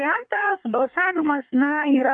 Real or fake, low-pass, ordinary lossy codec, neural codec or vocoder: fake; 9.9 kHz; MP3, 64 kbps; codec, 24 kHz, 1 kbps, SNAC